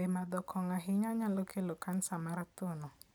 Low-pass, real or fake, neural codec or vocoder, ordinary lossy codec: none; real; none; none